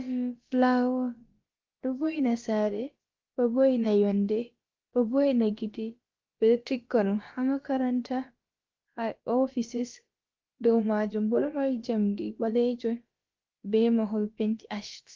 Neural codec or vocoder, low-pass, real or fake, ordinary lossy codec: codec, 16 kHz, about 1 kbps, DyCAST, with the encoder's durations; 7.2 kHz; fake; Opus, 32 kbps